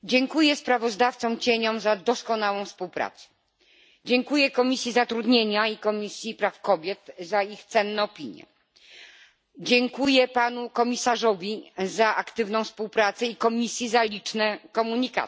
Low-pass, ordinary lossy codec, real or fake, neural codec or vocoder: none; none; real; none